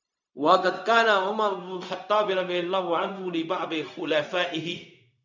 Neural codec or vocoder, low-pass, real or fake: codec, 16 kHz, 0.4 kbps, LongCat-Audio-Codec; 7.2 kHz; fake